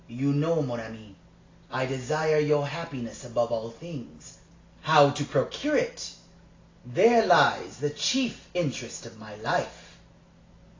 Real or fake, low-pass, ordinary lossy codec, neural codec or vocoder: real; 7.2 kHz; AAC, 32 kbps; none